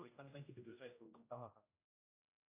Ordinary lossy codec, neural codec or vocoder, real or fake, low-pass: AAC, 24 kbps; codec, 16 kHz, 0.5 kbps, X-Codec, HuBERT features, trained on general audio; fake; 3.6 kHz